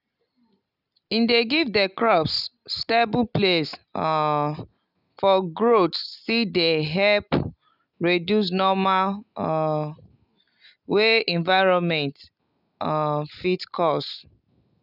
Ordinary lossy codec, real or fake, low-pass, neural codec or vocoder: none; real; 5.4 kHz; none